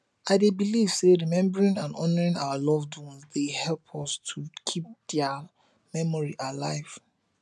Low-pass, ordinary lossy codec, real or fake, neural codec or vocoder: none; none; real; none